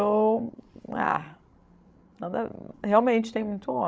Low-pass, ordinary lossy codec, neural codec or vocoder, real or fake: none; none; codec, 16 kHz, 16 kbps, FreqCodec, larger model; fake